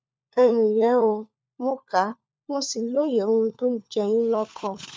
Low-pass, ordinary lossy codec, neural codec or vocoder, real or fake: none; none; codec, 16 kHz, 4 kbps, FunCodec, trained on LibriTTS, 50 frames a second; fake